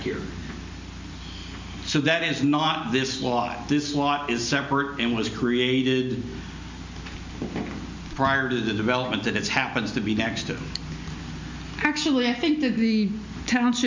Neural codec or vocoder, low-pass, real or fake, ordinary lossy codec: none; 7.2 kHz; real; MP3, 64 kbps